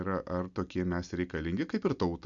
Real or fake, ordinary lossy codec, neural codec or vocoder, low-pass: real; Opus, 64 kbps; none; 7.2 kHz